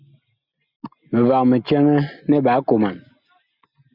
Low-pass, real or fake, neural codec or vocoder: 5.4 kHz; real; none